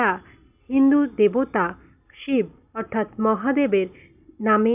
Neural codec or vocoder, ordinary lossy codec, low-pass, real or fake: none; none; 3.6 kHz; real